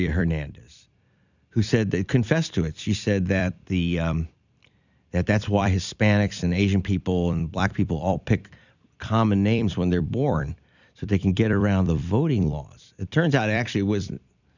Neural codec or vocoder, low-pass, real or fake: vocoder, 44.1 kHz, 128 mel bands every 256 samples, BigVGAN v2; 7.2 kHz; fake